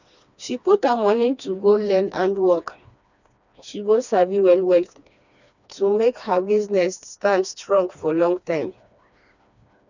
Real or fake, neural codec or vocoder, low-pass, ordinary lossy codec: fake; codec, 16 kHz, 2 kbps, FreqCodec, smaller model; 7.2 kHz; none